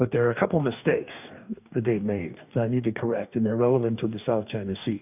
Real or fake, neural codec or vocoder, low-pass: fake; codec, 44.1 kHz, 2.6 kbps, DAC; 3.6 kHz